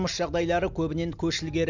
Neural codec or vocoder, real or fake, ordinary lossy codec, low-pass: none; real; none; 7.2 kHz